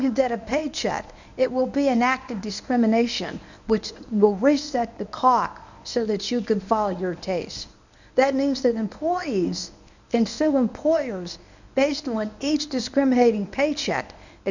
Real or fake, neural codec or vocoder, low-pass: fake; codec, 24 kHz, 0.9 kbps, WavTokenizer, medium speech release version 1; 7.2 kHz